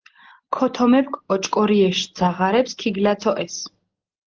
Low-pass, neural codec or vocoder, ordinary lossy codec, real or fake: 7.2 kHz; none; Opus, 16 kbps; real